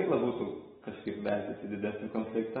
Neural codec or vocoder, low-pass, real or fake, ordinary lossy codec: none; 19.8 kHz; real; AAC, 16 kbps